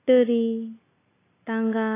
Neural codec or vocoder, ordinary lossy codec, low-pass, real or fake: none; AAC, 16 kbps; 3.6 kHz; real